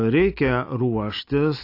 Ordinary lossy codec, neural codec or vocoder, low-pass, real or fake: AAC, 32 kbps; none; 5.4 kHz; real